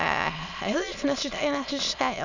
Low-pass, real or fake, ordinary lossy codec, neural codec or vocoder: 7.2 kHz; fake; none; autoencoder, 22.05 kHz, a latent of 192 numbers a frame, VITS, trained on many speakers